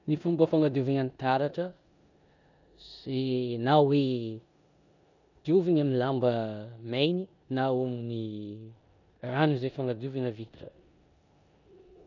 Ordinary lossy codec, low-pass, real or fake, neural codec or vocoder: none; 7.2 kHz; fake; codec, 16 kHz in and 24 kHz out, 0.9 kbps, LongCat-Audio-Codec, four codebook decoder